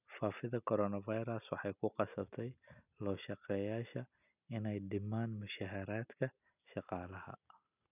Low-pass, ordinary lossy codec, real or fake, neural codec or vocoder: 3.6 kHz; none; real; none